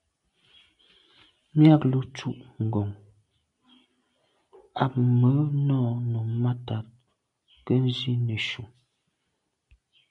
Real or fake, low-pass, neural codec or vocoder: real; 10.8 kHz; none